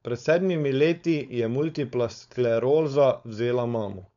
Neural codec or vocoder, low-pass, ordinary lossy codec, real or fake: codec, 16 kHz, 4.8 kbps, FACodec; 7.2 kHz; MP3, 96 kbps; fake